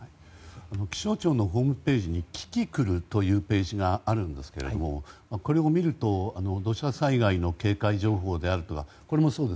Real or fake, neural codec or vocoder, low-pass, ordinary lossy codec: real; none; none; none